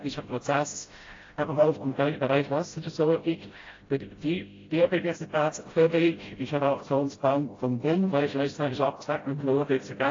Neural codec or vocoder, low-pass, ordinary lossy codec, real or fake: codec, 16 kHz, 0.5 kbps, FreqCodec, smaller model; 7.2 kHz; AAC, 32 kbps; fake